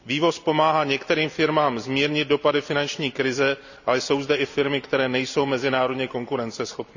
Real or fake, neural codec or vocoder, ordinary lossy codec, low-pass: real; none; none; 7.2 kHz